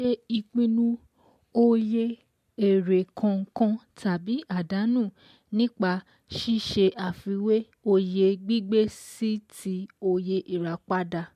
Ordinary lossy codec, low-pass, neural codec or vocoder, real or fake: MP3, 64 kbps; 14.4 kHz; none; real